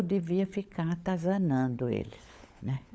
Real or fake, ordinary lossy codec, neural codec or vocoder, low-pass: fake; none; codec, 16 kHz, 16 kbps, FunCodec, trained on LibriTTS, 50 frames a second; none